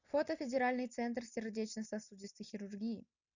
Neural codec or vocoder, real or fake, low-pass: none; real; 7.2 kHz